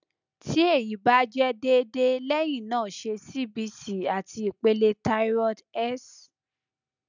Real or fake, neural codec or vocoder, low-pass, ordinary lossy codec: real; none; 7.2 kHz; none